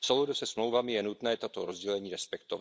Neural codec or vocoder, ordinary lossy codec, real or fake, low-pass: none; none; real; none